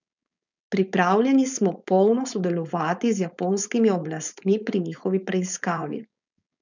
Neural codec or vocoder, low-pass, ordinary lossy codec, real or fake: codec, 16 kHz, 4.8 kbps, FACodec; 7.2 kHz; none; fake